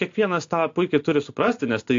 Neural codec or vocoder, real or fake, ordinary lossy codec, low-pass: none; real; AAC, 48 kbps; 7.2 kHz